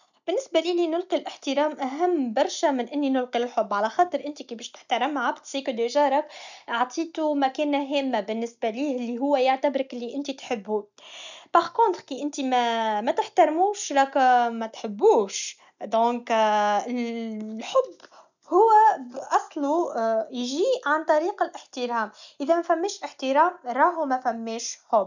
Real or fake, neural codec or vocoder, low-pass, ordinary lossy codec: real; none; 7.2 kHz; none